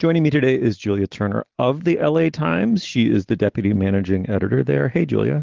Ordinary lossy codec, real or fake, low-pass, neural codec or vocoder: Opus, 16 kbps; real; 7.2 kHz; none